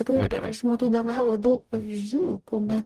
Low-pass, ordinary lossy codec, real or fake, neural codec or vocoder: 14.4 kHz; Opus, 24 kbps; fake; codec, 44.1 kHz, 0.9 kbps, DAC